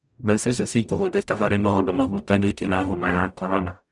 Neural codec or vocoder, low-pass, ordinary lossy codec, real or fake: codec, 44.1 kHz, 0.9 kbps, DAC; 10.8 kHz; none; fake